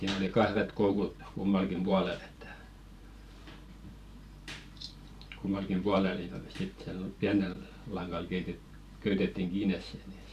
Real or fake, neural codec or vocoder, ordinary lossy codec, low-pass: fake; vocoder, 44.1 kHz, 128 mel bands every 512 samples, BigVGAN v2; none; 14.4 kHz